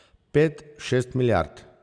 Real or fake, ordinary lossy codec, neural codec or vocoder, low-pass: real; none; none; 9.9 kHz